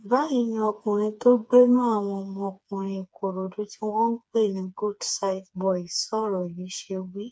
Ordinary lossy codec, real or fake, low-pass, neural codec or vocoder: none; fake; none; codec, 16 kHz, 4 kbps, FreqCodec, smaller model